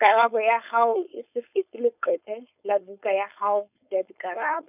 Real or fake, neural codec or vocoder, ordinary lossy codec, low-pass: fake; codec, 16 kHz, 4.8 kbps, FACodec; none; 3.6 kHz